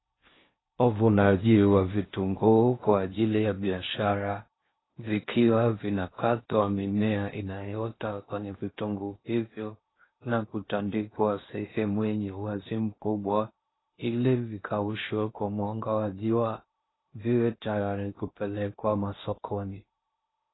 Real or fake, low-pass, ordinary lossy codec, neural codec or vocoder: fake; 7.2 kHz; AAC, 16 kbps; codec, 16 kHz in and 24 kHz out, 0.6 kbps, FocalCodec, streaming, 4096 codes